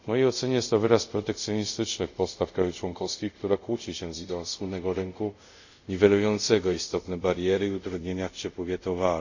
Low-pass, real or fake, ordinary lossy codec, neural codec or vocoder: 7.2 kHz; fake; none; codec, 24 kHz, 0.5 kbps, DualCodec